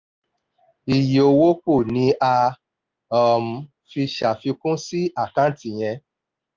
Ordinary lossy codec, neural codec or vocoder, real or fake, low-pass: Opus, 24 kbps; none; real; 7.2 kHz